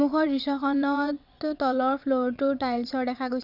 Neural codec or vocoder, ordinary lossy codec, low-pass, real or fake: vocoder, 22.05 kHz, 80 mel bands, WaveNeXt; none; 5.4 kHz; fake